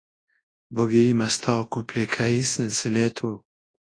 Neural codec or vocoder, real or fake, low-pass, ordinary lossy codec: codec, 24 kHz, 0.9 kbps, WavTokenizer, large speech release; fake; 9.9 kHz; AAC, 32 kbps